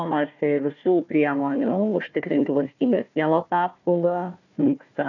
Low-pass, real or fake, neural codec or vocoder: 7.2 kHz; fake; codec, 16 kHz, 1 kbps, FunCodec, trained on Chinese and English, 50 frames a second